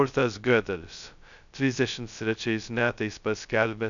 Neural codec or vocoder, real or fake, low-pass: codec, 16 kHz, 0.2 kbps, FocalCodec; fake; 7.2 kHz